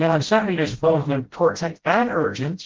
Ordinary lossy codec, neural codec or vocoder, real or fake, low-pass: Opus, 16 kbps; codec, 16 kHz, 0.5 kbps, FreqCodec, smaller model; fake; 7.2 kHz